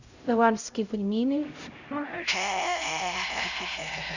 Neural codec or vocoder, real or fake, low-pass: codec, 16 kHz, 0.5 kbps, X-Codec, HuBERT features, trained on LibriSpeech; fake; 7.2 kHz